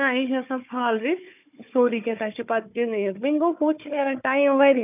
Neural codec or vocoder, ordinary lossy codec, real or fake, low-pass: codec, 16 kHz, 4 kbps, FunCodec, trained on Chinese and English, 50 frames a second; none; fake; 3.6 kHz